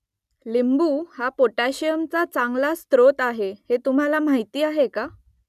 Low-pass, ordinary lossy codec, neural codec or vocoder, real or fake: 14.4 kHz; none; none; real